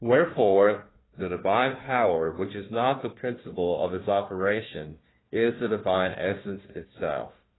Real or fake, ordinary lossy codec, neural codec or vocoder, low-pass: fake; AAC, 16 kbps; codec, 16 kHz, 1 kbps, FunCodec, trained on Chinese and English, 50 frames a second; 7.2 kHz